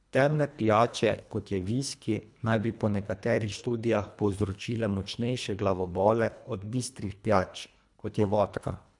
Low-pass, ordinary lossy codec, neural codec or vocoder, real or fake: 10.8 kHz; none; codec, 24 kHz, 1.5 kbps, HILCodec; fake